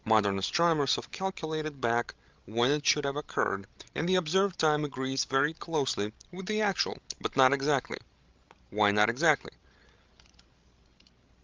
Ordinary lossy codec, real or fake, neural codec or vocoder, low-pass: Opus, 32 kbps; fake; codec, 16 kHz, 16 kbps, FreqCodec, larger model; 7.2 kHz